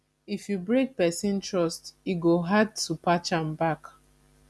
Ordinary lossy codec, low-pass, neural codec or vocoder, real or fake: none; none; none; real